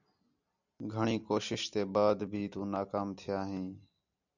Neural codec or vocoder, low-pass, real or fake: none; 7.2 kHz; real